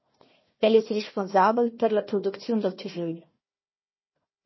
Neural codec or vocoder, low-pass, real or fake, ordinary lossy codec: codec, 16 kHz, 1 kbps, FunCodec, trained on Chinese and English, 50 frames a second; 7.2 kHz; fake; MP3, 24 kbps